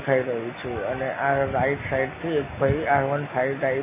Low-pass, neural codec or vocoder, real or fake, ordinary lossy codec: 3.6 kHz; none; real; MP3, 16 kbps